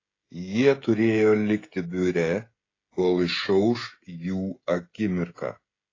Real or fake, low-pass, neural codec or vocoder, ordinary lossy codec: fake; 7.2 kHz; codec, 16 kHz, 16 kbps, FreqCodec, smaller model; AAC, 32 kbps